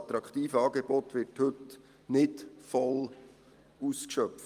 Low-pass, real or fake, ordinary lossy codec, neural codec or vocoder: 14.4 kHz; fake; Opus, 32 kbps; vocoder, 44.1 kHz, 128 mel bands every 256 samples, BigVGAN v2